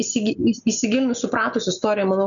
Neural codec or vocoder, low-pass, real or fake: none; 7.2 kHz; real